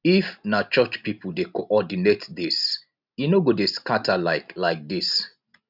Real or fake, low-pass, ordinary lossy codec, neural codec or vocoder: real; 5.4 kHz; none; none